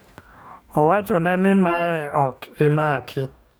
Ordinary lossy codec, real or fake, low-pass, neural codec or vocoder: none; fake; none; codec, 44.1 kHz, 2.6 kbps, DAC